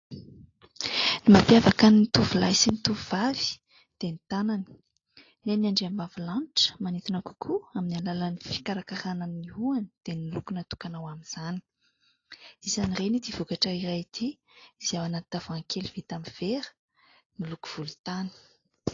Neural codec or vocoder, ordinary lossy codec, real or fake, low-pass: none; AAC, 32 kbps; real; 7.2 kHz